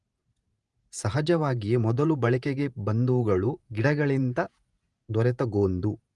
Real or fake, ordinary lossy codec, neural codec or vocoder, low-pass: fake; Opus, 32 kbps; vocoder, 48 kHz, 128 mel bands, Vocos; 10.8 kHz